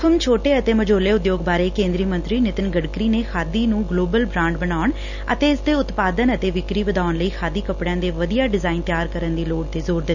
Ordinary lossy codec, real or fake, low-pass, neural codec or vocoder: none; real; 7.2 kHz; none